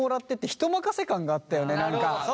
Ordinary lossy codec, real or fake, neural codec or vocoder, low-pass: none; real; none; none